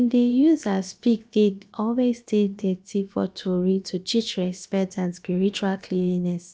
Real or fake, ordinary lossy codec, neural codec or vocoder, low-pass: fake; none; codec, 16 kHz, about 1 kbps, DyCAST, with the encoder's durations; none